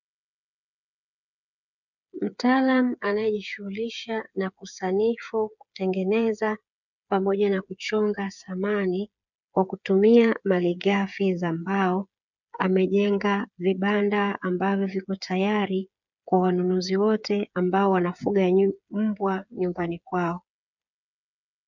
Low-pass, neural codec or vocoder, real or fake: 7.2 kHz; codec, 16 kHz, 8 kbps, FreqCodec, smaller model; fake